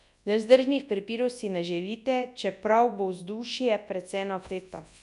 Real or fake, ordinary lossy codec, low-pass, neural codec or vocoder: fake; none; 10.8 kHz; codec, 24 kHz, 0.9 kbps, WavTokenizer, large speech release